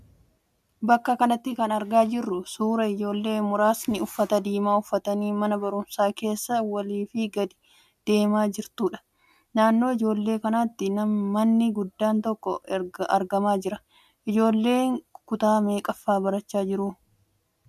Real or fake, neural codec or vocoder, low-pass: real; none; 14.4 kHz